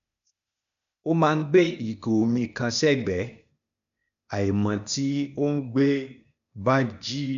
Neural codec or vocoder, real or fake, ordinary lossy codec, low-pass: codec, 16 kHz, 0.8 kbps, ZipCodec; fake; none; 7.2 kHz